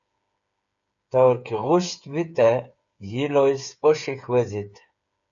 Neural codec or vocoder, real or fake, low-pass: codec, 16 kHz, 8 kbps, FreqCodec, smaller model; fake; 7.2 kHz